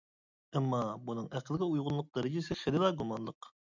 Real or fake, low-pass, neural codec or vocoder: real; 7.2 kHz; none